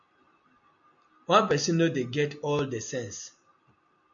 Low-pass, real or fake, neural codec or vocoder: 7.2 kHz; real; none